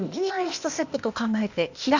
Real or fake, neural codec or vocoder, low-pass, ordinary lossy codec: fake; codec, 16 kHz, 0.8 kbps, ZipCodec; 7.2 kHz; none